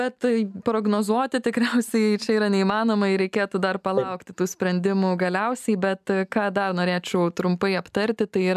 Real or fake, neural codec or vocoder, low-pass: real; none; 14.4 kHz